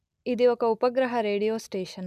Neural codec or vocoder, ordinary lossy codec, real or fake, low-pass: none; none; real; 14.4 kHz